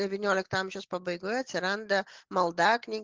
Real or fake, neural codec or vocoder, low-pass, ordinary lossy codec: real; none; 7.2 kHz; Opus, 16 kbps